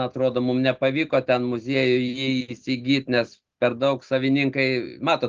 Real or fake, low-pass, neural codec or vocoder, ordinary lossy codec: real; 7.2 kHz; none; Opus, 24 kbps